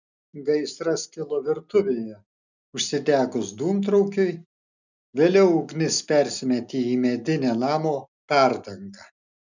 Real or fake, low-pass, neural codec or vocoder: real; 7.2 kHz; none